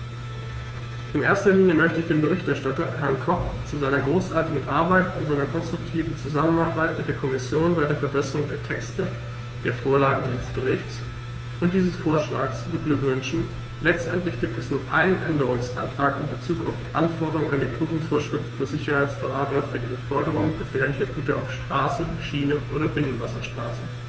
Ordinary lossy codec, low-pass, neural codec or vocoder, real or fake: none; none; codec, 16 kHz, 2 kbps, FunCodec, trained on Chinese and English, 25 frames a second; fake